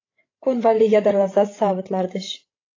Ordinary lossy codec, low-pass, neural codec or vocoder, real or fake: AAC, 32 kbps; 7.2 kHz; codec, 16 kHz, 16 kbps, FreqCodec, larger model; fake